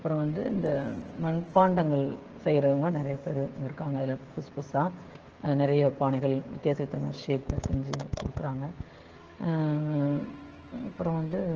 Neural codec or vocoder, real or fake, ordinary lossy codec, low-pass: codec, 16 kHz, 16 kbps, FreqCodec, smaller model; fake; Opus, 16 kbps; 7.2 kHz